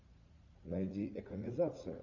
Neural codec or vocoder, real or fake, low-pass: vocoder, 44.1 kHz, 80 mel bands, Vocos; fake; 7.2 kHz